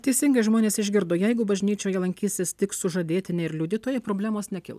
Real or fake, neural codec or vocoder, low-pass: real; none; 14.4 kHz